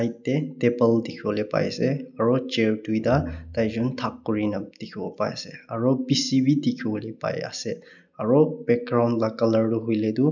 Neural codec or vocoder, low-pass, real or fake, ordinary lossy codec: none; 7.2 kHz; real; none